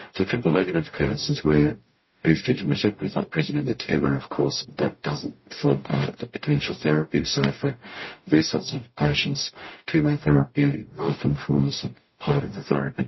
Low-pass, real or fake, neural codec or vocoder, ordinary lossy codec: 7.2 kHz; fake; codec, 44.1 kHz, 0.9 kbps, DAC; MP3, 24 kbps